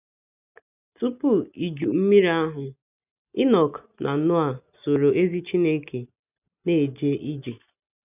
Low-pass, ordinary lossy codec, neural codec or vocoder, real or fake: 3.6 kHz; none; none; real